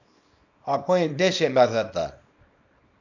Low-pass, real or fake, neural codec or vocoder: 7.2 kHz; fake; codec, 24 kHz, 0.9 kbps, WavTokenizer, small release